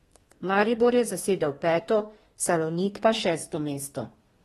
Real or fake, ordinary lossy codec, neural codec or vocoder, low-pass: fake; AAC, 32 kbps; codec, 32 kHz, 1.9 kbps, SNAC; 14.4 kHz